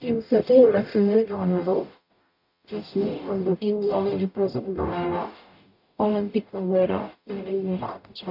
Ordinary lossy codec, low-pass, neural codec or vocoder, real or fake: none; 5.4 kHz; codec, 44.1 kHz, 0.9 kbps, DAC; fake